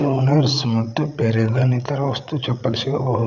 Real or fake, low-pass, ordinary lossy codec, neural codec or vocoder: fake; 7.2 kHz; none; codec, 16 kHz, 8 kbps, FreqCodec, larger model